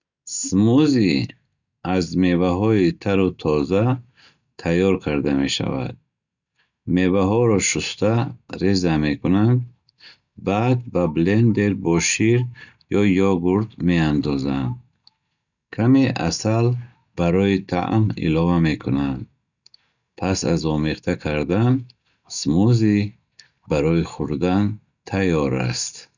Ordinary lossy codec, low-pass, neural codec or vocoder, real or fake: none; 7.2 kHz; none; real